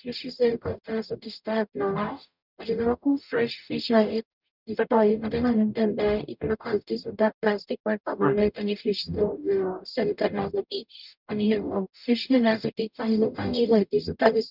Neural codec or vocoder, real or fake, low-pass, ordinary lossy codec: codec, 44.1 kHz, 0.9 kbps, DAC; fake; 5.4 kHz; none